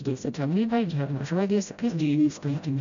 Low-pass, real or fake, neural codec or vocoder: 7.2 kHz; fake; codec, 16 kHz, 0.5 kbps, FreqCodec, smaller model